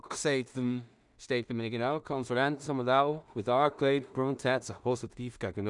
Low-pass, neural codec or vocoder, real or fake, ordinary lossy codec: 10.8 kHz; codec, 16 kHz in and 24 kHz out, 0.4 kbps, LongCat-Audio-Codec, two codebook decoder; fake; none